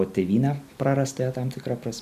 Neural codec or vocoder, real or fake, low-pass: none; real; 14.4 kHz